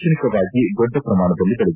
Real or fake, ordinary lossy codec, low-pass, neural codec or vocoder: real; none; 3.6 kHz; none